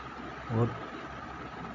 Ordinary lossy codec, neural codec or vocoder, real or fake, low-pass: none; none; real; 7.2 kHz